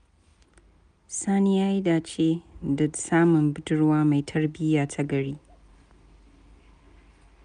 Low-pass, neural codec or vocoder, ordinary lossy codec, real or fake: 9.9 kHz; none; none; real